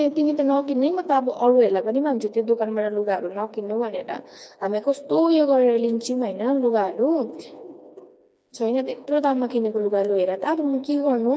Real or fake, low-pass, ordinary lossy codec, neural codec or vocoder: fake; none; none; codec, 16 kHz, 2 kbps, FreqCodec, smaller model